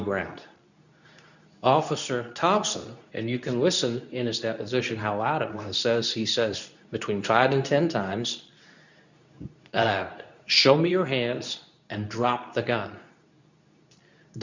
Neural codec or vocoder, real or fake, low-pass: codec, 24 kHz, 0.9 kbps, WavTokenizer, medium speech release version 2; fake; 7.2 kHz